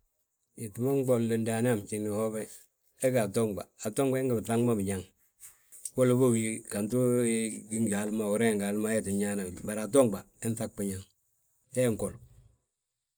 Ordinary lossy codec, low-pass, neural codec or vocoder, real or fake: none; none; none; real